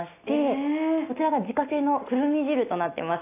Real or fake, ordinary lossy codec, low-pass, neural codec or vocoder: real; AAC, 24 kbps; 3.6 kHz; none